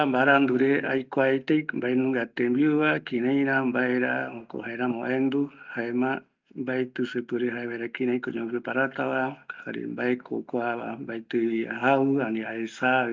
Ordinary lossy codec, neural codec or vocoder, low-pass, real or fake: Opus, 24 kbps; none; 7.2 kHz; real